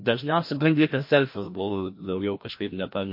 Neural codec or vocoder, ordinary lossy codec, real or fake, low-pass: codec, 16 kHz, 1 kbps, FreqCodec, larger model; MP3, 32 kbps; fake; 5.4 kHz